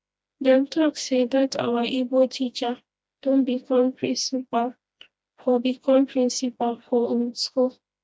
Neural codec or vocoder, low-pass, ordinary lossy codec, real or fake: codec, 16 kHz, 1 kbps, FreqCodec, smaller model; none; none; fake